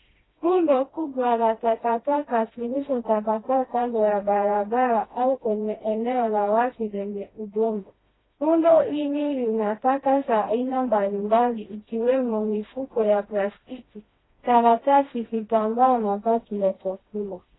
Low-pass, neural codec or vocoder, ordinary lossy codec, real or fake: 7.2 kHz; codec, 16 kHz, 1 kbps, FreqCodec, smaller model; AAC, 16 kbps; fake